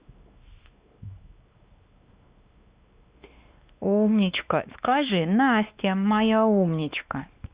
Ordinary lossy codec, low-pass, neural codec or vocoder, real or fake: Opus, 64 kbps; 3.6 kHz; codec, 16 kHz, 2 kbps, X-Codec, WavLM features, trained on Multilingual LibriSpeech; fake